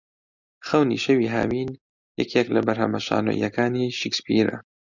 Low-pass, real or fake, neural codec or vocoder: 7.2 kHz; real; none